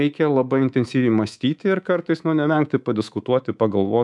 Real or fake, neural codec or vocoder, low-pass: fake; codec, 24 kHz, 3.1 kbps, DualCodec; 10.8 kHz